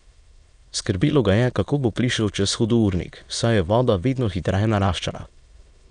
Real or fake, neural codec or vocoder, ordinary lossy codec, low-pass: fake; autoencoder, 22.05 kHz, a latent of 192 numbers a frame, VITS, trained on many speakers; none; 9.9 kHz